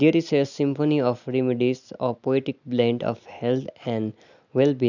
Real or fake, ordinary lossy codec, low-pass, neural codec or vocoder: real; none; 7.2 kHz; none